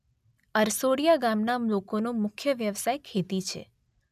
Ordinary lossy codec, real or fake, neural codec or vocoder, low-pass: none; real; none; 14.4 kHz